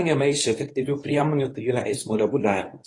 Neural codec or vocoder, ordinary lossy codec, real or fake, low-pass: codec, 24 kHz, 0.9 kbps, WavTokenizer, medium speech release version 1; AAC, 32 kbps; fake; 10.8 kHz